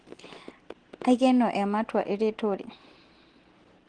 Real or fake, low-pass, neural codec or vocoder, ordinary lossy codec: real; 9.9 kHz; none; Opus, 16 kbps